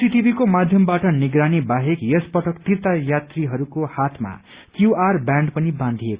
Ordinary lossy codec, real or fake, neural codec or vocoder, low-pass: Opus, 64 kbps; real; none; 3.6 kHz